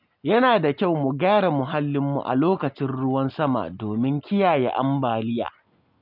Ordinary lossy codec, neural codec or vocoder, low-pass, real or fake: none; none; 5.4 kHz; real